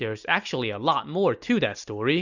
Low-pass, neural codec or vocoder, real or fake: 7.2 kHz; none; real